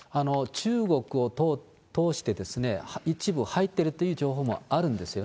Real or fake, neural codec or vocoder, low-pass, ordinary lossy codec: real; none; none; none